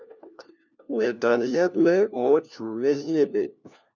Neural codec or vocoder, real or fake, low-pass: codec, 16 kHz, 1 kbps, FunCodec, trained on LibriTTS, 50 frames a second; fake; 7.2 kHz